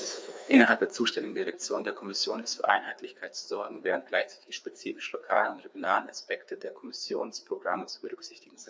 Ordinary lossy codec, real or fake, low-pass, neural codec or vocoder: none; fake; none; codec, 16 kHz, 2 kbps, FreqCodec, larger model